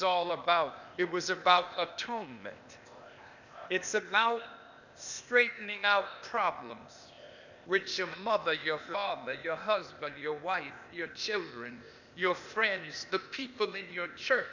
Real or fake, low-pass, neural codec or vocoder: fake; 7.2 kHz; codec, 16 kHz, 0.8 kbps, ZipCodec